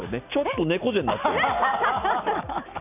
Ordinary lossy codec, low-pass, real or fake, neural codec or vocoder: none; 3.6 kHz; real; none